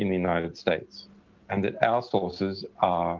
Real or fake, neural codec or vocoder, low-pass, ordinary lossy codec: real; none; 7.2 kHz; Opus, 32 kbps